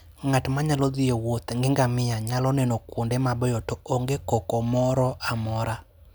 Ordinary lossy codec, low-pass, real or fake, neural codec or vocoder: none; none; real; none